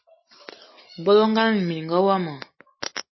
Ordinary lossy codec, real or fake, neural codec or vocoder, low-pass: MP3, 24 kbps; real; none; 7.2 kHz